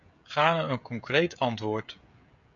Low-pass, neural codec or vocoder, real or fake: 7.2 kHz; codec, 16 kHz, 16 kbps, FreqCodec, smaller model; fake